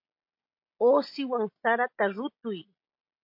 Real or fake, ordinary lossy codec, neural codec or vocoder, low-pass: real; MP3, 32 kbps; none; 5.4 kHz